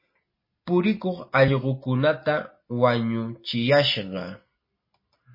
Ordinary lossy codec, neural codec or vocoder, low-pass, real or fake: MP3, 24 kbps; none; 5.4 kHz; real